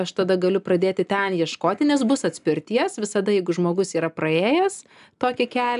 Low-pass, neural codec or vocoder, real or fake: 10.8 kHz; none; real